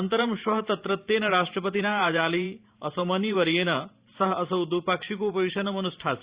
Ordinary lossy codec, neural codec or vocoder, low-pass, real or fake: Opus, 64 kbps; vocoder, 44.1 kHz, 128 mel bands every 512 samples, BigVGAN v2; 3.6 kHz; fake